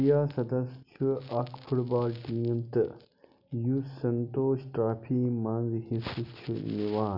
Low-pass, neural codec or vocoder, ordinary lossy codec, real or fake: 5.4 kHz; none; none; real